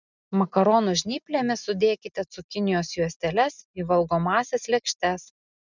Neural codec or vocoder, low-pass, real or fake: none; 7.2 kHz; real